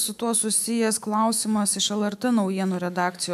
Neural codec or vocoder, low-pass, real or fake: autoencoder, 48 kHz, 128 numbers a frame, DAC-VAE, trained on Japanese speech; 14.4 kHz; fake